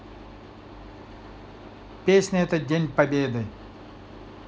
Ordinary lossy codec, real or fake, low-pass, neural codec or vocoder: none; real; none; none